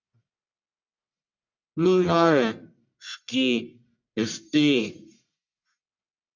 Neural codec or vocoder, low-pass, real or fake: codec, 44.1 kHz, 1.7 kbps, Pupu-Codec; 7.2 kHz; fake